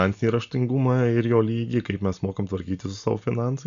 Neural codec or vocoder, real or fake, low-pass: none; real; 7.2 kHz